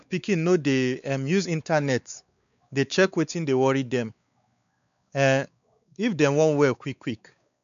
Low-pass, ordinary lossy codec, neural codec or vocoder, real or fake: 7.2 kHz; none; codec, 16 kHz, 4 kbps, X-Codec, WavLM features, trained on Multilingual LibriSpeech; fake